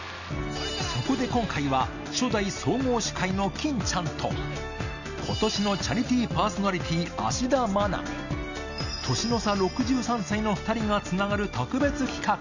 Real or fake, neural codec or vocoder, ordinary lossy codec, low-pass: real; none; none; 7.2 kHz